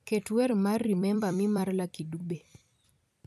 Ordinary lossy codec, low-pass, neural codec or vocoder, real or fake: none; 14.4 kHz; vocoder, 44.1 kHz, 128 mel bands every 256 samples, BigVGAN v2; fake